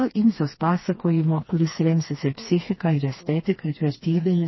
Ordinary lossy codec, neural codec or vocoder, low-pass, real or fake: MP3, 24 kbps; codec, 16 kHz, 2 kbps, FreqCodec, smaller model; 7.2 kHz; fake